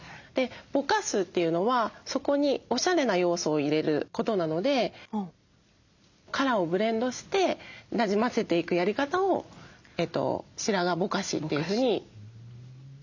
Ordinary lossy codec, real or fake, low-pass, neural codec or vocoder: none; real; 7.2 kHz; none